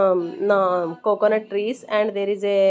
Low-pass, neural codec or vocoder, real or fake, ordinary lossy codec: none; none; real; none